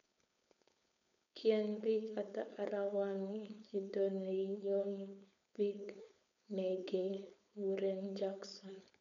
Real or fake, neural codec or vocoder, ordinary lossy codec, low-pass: fake; codec, 16 kHz, 4.8 kbps, FACodec; none; 7.2 kHz